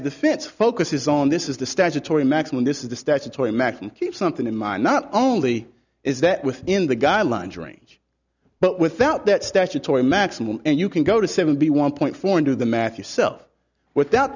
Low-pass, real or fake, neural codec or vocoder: 7.2 kHz; real; none